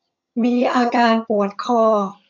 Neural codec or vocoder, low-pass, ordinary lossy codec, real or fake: vocoder, 22.05 kHz, 80 mel bands, HiFi-GAN; 7.2 kHz; AAC, 48 kbps; fake